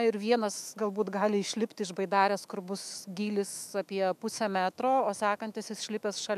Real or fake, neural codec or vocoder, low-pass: fake; autoencoder, 48 kHz, 128 numbers a frame, DAC-VAE, trained on Japanese speech; 14.4 kHz